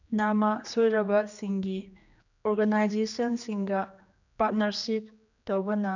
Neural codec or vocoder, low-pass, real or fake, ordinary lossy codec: codec, 16 kHz, 2 kbps, X-Codec, HuBERT features, trained on general audio; 7.2 kHz; fake; none